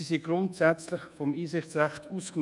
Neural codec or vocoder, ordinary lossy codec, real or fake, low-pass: codec, 24 kHz, 1.2 kbps, DualCodec; none; fake; 10.8 kHz